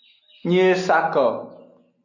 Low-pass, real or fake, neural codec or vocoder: 7.2 kHz; real; none